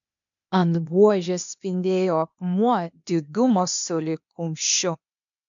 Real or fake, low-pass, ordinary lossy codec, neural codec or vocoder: fake; 7.2 kHz; MP3, 64 kbps; codec, 16 kHz, 0.8 kbps, ZipCodec